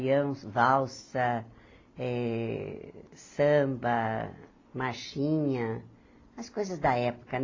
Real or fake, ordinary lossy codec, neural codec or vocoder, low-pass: real; AAC, 32 kbps; none; 7.2 kHz